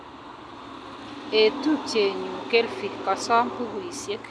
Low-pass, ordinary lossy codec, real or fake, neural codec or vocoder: none; none; real; none